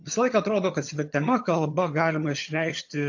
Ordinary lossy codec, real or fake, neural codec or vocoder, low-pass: AAC, 48 kbps; fake; vocoder, 22.05 kHz, 80 mel bands, HiFi-GAN; 7.2 kHz